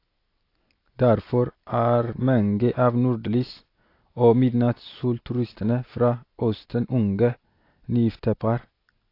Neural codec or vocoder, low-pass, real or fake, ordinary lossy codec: none; 5.4 kHz; real; AAC, 32 kbps